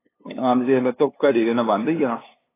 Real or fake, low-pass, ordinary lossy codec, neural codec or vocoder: fake; 3.6 kHz; AAC, 16 kbps; codec, 16 kHz, 2 kbps, FunCodec, trained on LibriTTS, 25 frames a second